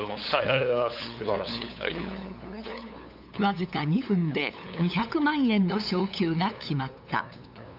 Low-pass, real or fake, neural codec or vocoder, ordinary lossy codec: 5.4 kHz; fake; codec, 16 kHz, 8 kbps, FunCodec, trained on LibriTTS, 25 frames a second; none